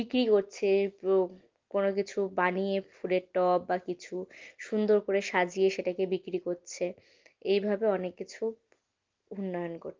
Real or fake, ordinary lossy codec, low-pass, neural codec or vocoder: real; Opus, 32 kbps; 7.2 kHz; none